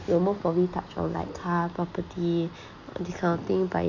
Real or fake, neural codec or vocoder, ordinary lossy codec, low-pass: real; none; none; 7.2 kHz